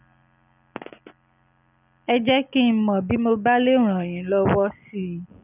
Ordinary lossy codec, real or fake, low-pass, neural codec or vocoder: none; real; 3.6 kHz; none